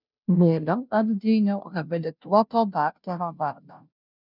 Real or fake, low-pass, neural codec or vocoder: fake; 5.4 kHz; codec, 16 kHz, 0.5 kbps, FunCodec, trained on Chinese and English, 25 frames a second